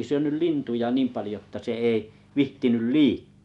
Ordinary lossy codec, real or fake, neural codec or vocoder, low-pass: none; real; none; 10.8 kHz